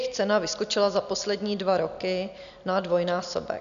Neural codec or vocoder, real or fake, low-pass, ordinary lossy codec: none; real; 7.2 kHz; AAC, 96 kbps